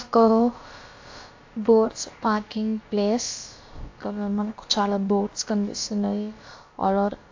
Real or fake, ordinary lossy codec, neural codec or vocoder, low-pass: fake; AAC, 48 kbps; codec, 16 kHz, about 1 kbps, DyCAST, with the encoder's durations; 7.2 kHz